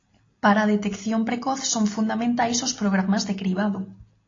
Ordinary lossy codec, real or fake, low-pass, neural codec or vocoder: AAC, 32 kbps; real; 7.2 kHz; none